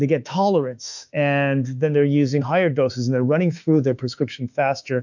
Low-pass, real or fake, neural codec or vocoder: 7.2 kHz; fake; autoencoder, 48 kHz, 32 numbers a frame, DAC-VAE, trained on Japanese speech